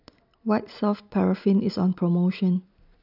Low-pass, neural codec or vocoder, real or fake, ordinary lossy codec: 5.4 kHz; none; real; none